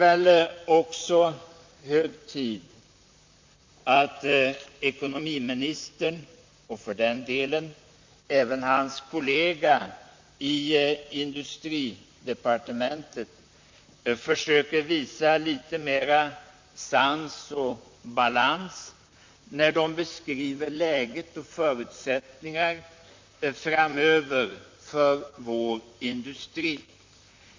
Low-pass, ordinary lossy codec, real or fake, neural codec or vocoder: 7.2 kHz; MP3, 48 kbps; fake; vocoder, 44.1 kHz, 128 mel bands, Pupu-Vocoder